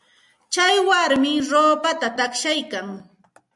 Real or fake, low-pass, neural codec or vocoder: real; 10.8 kHz; none